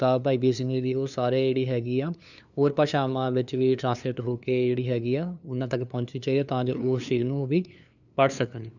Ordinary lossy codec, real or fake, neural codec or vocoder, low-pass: none; fake; codec, 16 kHz, 2 kbps, FunCodec, trained on LibriTTS, 25 frames a second; 7.2 kHz